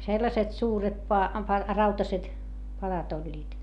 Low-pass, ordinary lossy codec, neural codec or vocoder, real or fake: 10.8 kHz; none; none; real